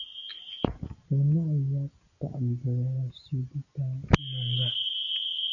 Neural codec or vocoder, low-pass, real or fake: none; 7.2 kHz; real